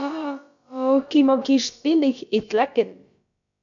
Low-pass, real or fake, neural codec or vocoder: 7.2 kHz; fake; codec, 16 kHz, about 1 kbps, DyCAST, with the encoder's durations